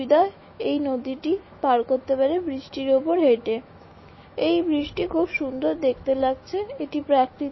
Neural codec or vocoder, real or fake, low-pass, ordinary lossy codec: autoencoder, 48 kHz, 128 numbers a frame, DAC-VAE, trained on Japanese speech; fake; 7.2 kHz; MP3, 24 kbps